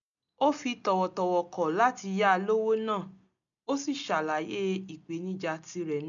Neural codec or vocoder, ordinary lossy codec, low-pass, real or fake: none; none; 7.2 kHz; real